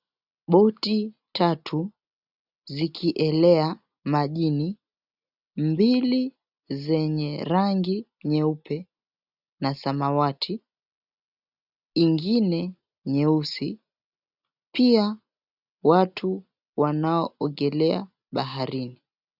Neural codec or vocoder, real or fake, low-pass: none; real; 5.4 kHz